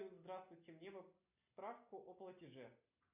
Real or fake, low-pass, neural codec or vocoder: real; 3.6 kHz; none